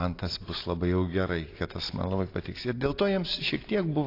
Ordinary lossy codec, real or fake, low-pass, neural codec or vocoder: AAC, 32 kbps; real; 5.4 kHz; none